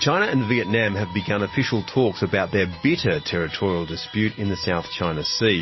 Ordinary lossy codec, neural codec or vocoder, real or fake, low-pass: MP3, 24 kbps; none; real; 7.2 kHz